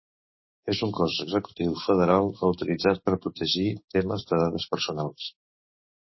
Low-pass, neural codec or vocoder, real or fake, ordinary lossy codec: 7.2 kHz; codec, 16 kHz, 6 kbps, DAC; fake; MP3, 24 kbps